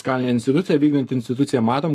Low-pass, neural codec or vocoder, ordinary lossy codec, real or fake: 14.4 kHz; codec, 44.1 kHz, 7.8 kbps, Pupu-Codec; AAC, 64 kbps; fake